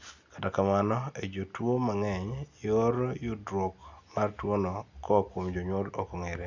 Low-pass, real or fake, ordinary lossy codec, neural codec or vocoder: 7.2 kHz; real; Opus, 64 kbps; none